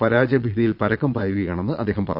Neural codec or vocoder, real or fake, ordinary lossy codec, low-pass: vocoder, 22.05 kHz, 80 mel bands, Vocos; fake; Opus, 64 kbps; 5.4 kHz